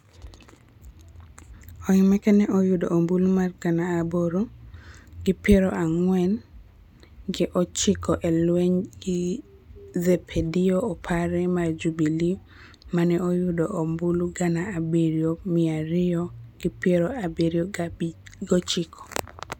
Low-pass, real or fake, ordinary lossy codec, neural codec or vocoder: 19.8 kHz; real; none; none